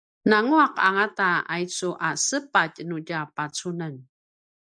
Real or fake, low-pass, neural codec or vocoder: real; 9.9 kHz; none